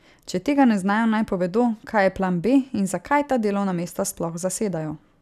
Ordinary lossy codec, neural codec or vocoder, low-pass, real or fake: none; none; 14.4 kHz; real